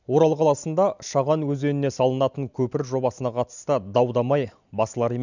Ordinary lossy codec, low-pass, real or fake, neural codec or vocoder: none; 7.2 kHz; real; none